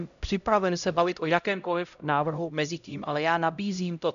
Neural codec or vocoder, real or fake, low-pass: codec, 16 kHz, 0.5 kbps, X-Codec, HuBERT features, trained on LibriSpeech; fake; 7.2 kHz